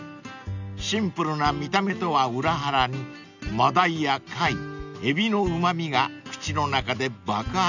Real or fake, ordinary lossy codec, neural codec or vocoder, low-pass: real; none; none; 7.2 kHz